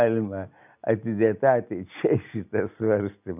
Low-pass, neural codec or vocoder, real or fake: 3.6 kHz; none; real